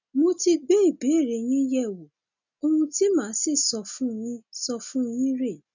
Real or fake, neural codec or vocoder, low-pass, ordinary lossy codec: real; none; 7.2 kHz; none